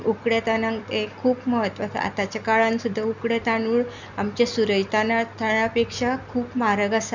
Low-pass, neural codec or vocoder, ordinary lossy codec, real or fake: 7.2 kHz; none; none; real